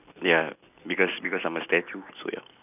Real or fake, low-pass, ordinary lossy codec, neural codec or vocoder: real; 3.6 kHz; none; none